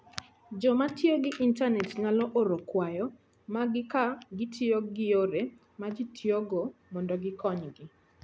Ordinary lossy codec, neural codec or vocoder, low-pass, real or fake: none; none; none; real